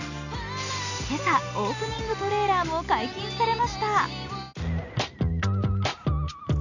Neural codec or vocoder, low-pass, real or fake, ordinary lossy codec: none; 7.2 kHz; real; none